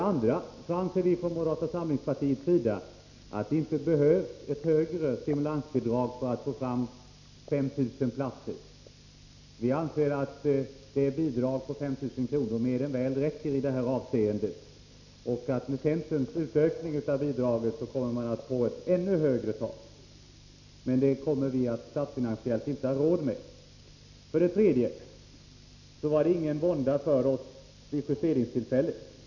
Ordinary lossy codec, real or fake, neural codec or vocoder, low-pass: none; real; none; 7.2 kHz